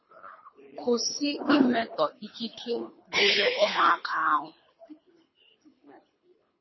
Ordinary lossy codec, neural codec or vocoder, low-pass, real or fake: MP3, 24 kbps; codec, 24 kHz, 3 kbps, HILCodec; 7.2 kHz; fake